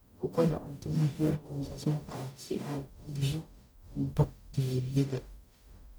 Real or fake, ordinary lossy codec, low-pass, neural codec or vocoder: fake; none; none; codec, 44.1 kHz, 0.9 kbps, DAC